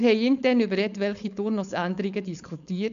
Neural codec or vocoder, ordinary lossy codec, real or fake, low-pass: codec, 16 kHz, 4.8 kbps, FACodec; none; fake; 7.2 kHz